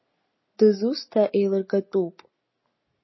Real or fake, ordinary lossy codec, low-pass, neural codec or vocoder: real; MP3, 24 kbps; 7.2 kHz; none